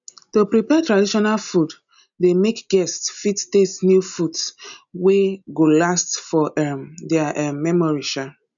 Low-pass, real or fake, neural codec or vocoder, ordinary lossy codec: 7.2 kHz; real; none; none